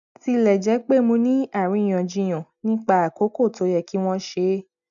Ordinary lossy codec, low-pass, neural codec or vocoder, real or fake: none; 7.2 kHz; none; real